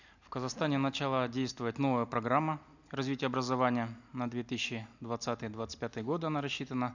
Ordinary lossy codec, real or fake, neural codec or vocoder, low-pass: none; real; none; 7.2 kHz